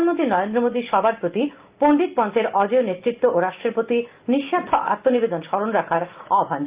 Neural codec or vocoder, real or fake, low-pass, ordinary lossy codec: none; real; 3.6 kHz; Opus, 32 kbps